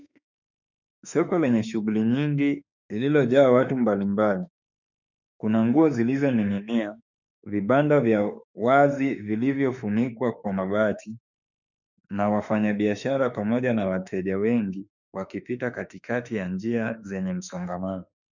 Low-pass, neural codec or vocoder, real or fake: 7.2 kHz; autoencoder, 48 kHz, 32 numbers a frame, DAC-VAE, trained on Japanese speech; fake